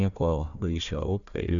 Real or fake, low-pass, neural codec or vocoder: fake; 7.2 kHz; codec, 16 kHz, 1 kbps, FunCodec, trained on Chinese and English, 50 frames a second